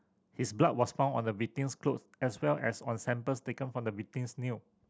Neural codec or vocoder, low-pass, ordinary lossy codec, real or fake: none; none; none; real